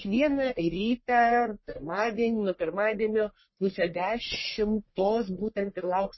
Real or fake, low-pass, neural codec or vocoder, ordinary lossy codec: fake; 7.2 kHz; codec, 44.1 kHz, 1.7 kbps, Pupu-Codec; MP3, 24 kbps